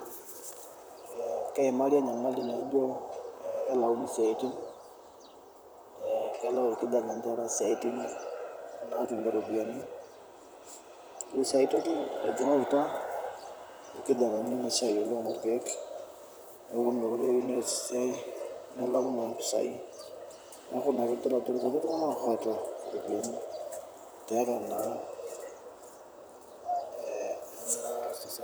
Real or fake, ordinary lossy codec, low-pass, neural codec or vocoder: fake; none; none; vocoder, 44.1 kHz, 128 mel bands, Pupu-Vocoder